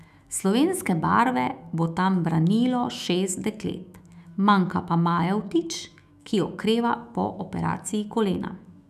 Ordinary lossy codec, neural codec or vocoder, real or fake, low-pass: none; autoencoder, 48 kHz, 128 numbers a frame, DAC-VAE, trained on Japanese speech; fake; 14.4 kHz